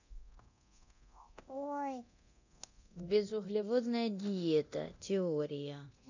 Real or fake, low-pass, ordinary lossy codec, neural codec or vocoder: fake; 7.2 kHz; none; codec, 24 kHz, 0.9 kbps, DualCodec